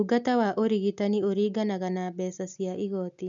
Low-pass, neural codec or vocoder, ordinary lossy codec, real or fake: 7.2 kHz; none; none; real